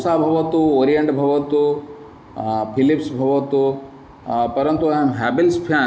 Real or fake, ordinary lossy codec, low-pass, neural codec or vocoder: real; none; none; none